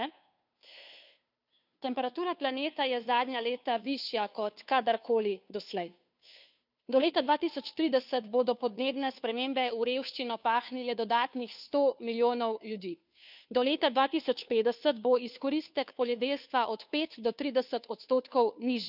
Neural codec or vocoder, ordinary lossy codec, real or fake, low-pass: codec, 16 kHz, 2 kbps, FunCodec, trained on Chinese and English, 25 frames a second; none; fake; 5.4 kHz